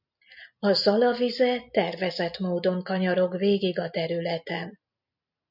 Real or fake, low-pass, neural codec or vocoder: real; 5.4 kHz; none